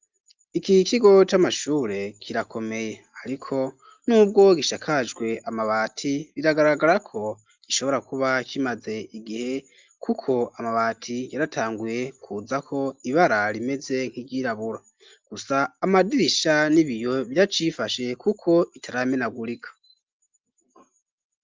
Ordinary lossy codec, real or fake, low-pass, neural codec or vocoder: Opus, 24 kbps; real; 7.2 kHz; none